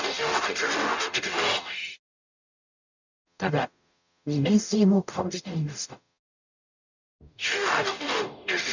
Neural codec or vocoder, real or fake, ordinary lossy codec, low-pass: codec, 44.1 kHz, 0.9 kbps, DAC; fake; none; 7.2 kHz